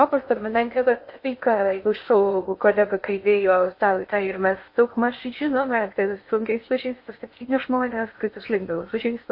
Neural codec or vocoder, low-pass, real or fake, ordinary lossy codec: codec, 16 kHz in and 24 kHz out, 0.6 kbps, FocalCodec, streaming, 2048 codes; 5.4 kHz; fake; MP3, 32 kbps